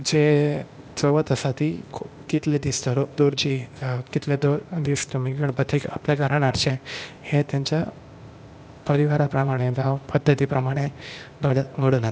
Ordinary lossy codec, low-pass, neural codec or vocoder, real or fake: none; none; codec, 16 kHz, 0.8 kbps, ZipCodec; fake